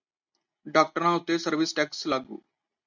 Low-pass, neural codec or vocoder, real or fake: 7.2 kHz; none; real